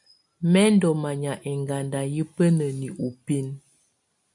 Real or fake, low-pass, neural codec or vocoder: real; 10.8 kHz; none